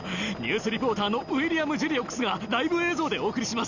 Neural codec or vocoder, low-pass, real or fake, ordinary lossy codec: none; 7.2 kHz; real; none